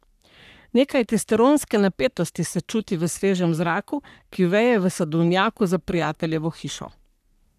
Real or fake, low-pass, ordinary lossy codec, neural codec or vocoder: fake; 14.4 kHz; none; codec, 44.1 kHz, 3.4 kbps, Pupu-Codec